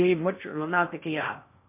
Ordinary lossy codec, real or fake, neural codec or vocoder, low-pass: MP3, 24 kbps; fake; codec, 16 kHz in and 24 kHz out, 0.6 kbps, FocalCodec, streaming, 2048 codes; 3.6 kHz